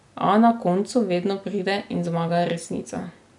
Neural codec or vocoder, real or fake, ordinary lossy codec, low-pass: none; real; none; 10.8 kHz